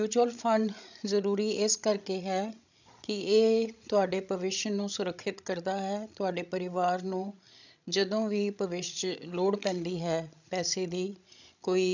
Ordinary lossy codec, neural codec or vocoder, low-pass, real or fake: none; codec, 16 kHz, 16 kbps, FreqCodec, larger model; 7.2 kHz; fake